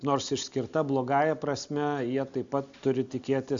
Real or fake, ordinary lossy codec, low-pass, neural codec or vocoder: real; Opus, 64 kbps; 7.2 kHz; none